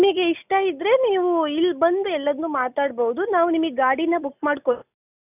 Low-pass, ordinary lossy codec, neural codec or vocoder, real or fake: 3.6 kHz; none; none; real